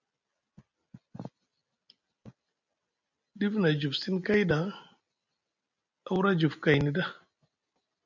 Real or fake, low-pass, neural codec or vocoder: real; 7.2 kHz; none